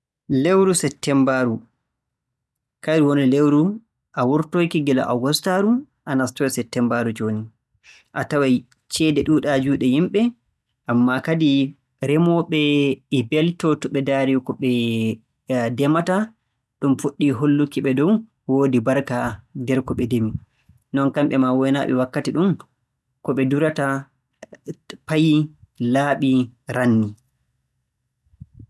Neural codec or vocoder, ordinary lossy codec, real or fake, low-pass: none; none; real; none